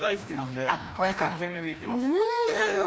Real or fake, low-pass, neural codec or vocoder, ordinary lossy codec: fake; none; codec, 16 kHz, 1 kbps, FreqCodec, larger model; none